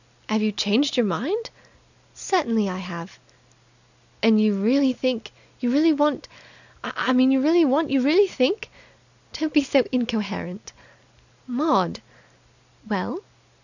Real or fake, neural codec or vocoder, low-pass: real; none; 7.2 kHz